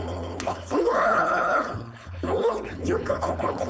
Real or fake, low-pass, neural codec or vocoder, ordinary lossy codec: fake; none; codec, 16 kHz, 4.8 kbps, FACodec; none